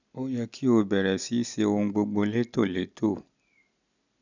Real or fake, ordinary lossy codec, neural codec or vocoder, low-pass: real; none; none; 7.2 kHz